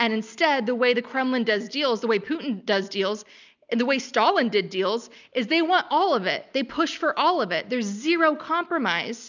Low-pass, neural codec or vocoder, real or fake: 7.2 kHz; none; real